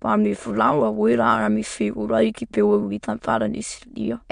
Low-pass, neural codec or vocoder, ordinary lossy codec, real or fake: 9.9 kHz; autoencoder, 22.05 kHz, a latent of 192 numbers a frame, VITS, trained on many speakers; MP3, 64 kbps; fake